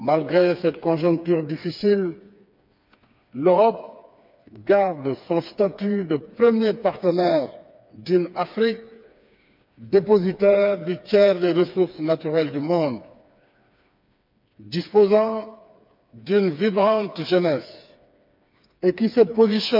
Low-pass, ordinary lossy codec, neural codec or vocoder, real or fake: 5.4 kHz; MP3, 48 kbps; codec, 16 kHz, 4 kbps, FreqCodec, smaller model; fake